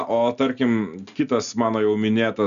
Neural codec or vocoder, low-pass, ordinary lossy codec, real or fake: none; 7.2 kHz; MP3, 96 kbps; real